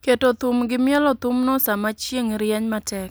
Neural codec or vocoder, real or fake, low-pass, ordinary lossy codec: none; real; none; none